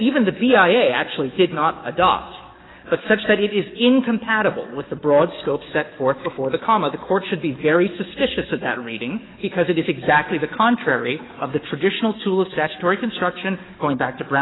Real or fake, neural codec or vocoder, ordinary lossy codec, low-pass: fake; codec, 44.1 kHz, 7.8 kbps, DAC; AAC, 16 kbps; 7.2 kHz